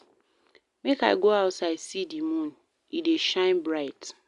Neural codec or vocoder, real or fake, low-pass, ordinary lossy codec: none; real; 10.8 kHz; Opus, 64 kbps